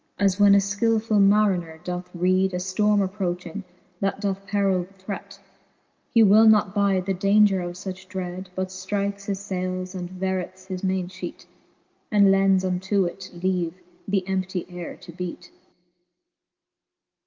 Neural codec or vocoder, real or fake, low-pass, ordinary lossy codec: none; real; 7.2 kHz; Opus, 24 kbps